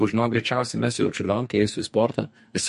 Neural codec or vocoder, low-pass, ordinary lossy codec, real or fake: codec, 44.1 kHz, 2.6 kbps, SNAC; 14.4 kHz; MP3, 48 kbps; fake